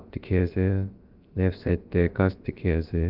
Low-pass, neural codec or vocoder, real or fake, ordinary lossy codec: 5.4 kHz; codec, 16 kHz, about 1 kbps, DyCAST, with the encoder's durations; fake; Opus, 24 kbps